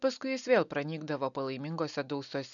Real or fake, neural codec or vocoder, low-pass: real; none; 7.2 kHz